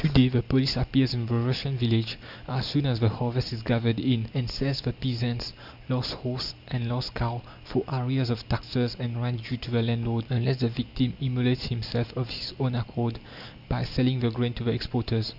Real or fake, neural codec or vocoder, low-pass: real; none; 5.4 kHz